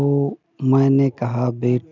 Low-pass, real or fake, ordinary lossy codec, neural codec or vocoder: 7.2 kHz; real; none; none